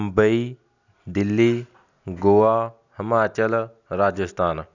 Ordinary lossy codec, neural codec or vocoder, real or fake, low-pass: none; none; real; 7.2 kHz